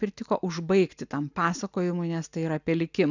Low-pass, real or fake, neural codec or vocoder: 7.2 kHz; real; none